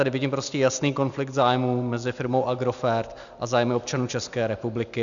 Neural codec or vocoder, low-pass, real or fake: none; 7.2 kHz; real